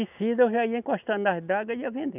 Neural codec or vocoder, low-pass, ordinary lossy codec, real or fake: none; 3.6 kHz; none; real